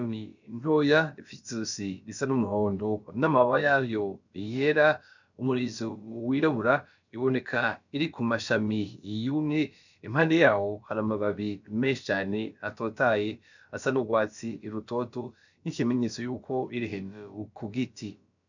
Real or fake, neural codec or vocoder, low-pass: fake; codec, 16 kHz, about 1 kbps, DyCAST, with the encoder's durations; 7.2 kHz